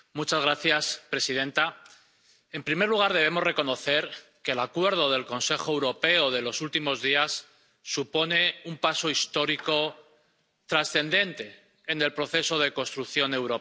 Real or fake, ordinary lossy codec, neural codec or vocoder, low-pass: real; none; none; none